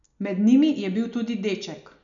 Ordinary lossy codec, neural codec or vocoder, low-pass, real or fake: none; none; 7.2 kHz; real